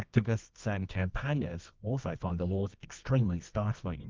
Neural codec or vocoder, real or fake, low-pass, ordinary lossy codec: codec, 24 kHz, 0.9 kbps, WavTokenizer, medium music audio release; fake; 7.2 kHz; Opus, 32 kbps